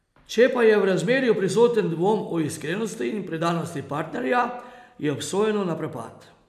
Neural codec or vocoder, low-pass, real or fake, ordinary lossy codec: none; 14.4 kHz; real; none